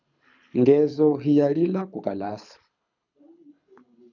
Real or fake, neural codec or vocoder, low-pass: fake; codec, 24 kHz, 6 kbps, HILCodec; 7.2 kHz